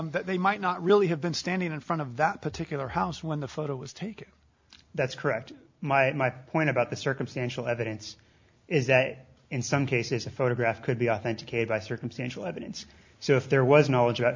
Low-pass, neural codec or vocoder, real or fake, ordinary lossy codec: 7.2 kHz; none; real; MP3, 64 kbps